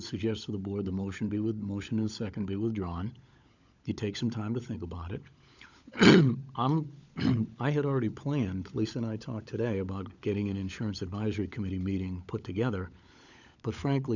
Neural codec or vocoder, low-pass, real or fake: codec, 16 kHz, 16 kbps, FunCodec, trained on LibriTTS, 50 frames a second; 7.2 kHz; fake